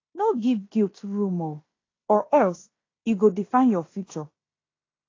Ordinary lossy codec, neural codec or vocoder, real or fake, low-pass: AAC, 32 kbps; codec, 16 kHz in and 24 kHz out, 0.9 kbps, LongCat-Audio-Codec, fine tuned four codebook decoder; fake; 7.2 kHz